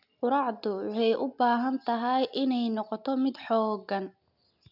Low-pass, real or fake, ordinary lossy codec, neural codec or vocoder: 5.4 kHz; real; none; none